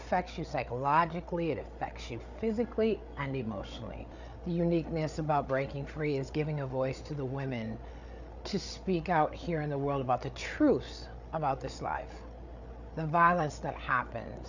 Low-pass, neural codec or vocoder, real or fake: 7.2 kHz; codec, 16 kHz, 8 kbps, FreqCodec, larger model; fake